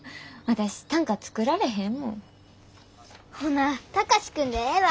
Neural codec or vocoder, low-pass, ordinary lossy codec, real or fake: none; none; none; real